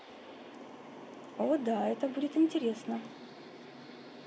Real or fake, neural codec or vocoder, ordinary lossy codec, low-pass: real; none; none; none